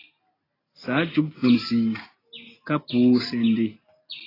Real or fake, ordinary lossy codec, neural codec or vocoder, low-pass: real; AAC, 24 kbps; none; 5.4 kHz